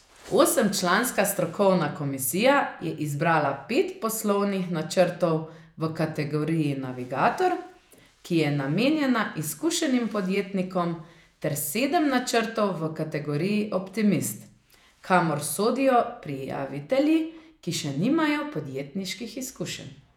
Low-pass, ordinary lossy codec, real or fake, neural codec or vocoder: 19.8 kHz; none; fake; vocoder, 48 kHz, 128 mel bands, Vocos